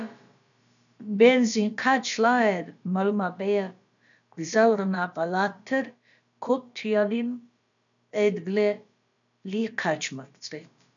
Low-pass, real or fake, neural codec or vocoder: 7.2 kHz; fake; codec, 16 kHz, about 1 kbps, DyCAST, with the encoder's durations